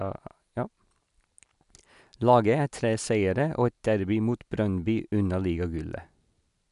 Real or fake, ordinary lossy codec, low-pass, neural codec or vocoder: real; MP3, 96 kbps; 10.8 kHz; none